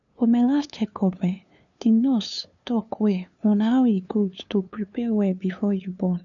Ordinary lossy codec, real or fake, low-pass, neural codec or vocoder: none; fake; 7.2 kHz; codec, 16 kHz, 2 kbps, FunCodec, trained on LibriTTS, 25 frames a second